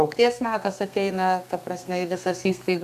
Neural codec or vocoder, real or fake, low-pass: codec, 32 kHz, 1.9 kbps, SNAC; fake; 14.4 kHz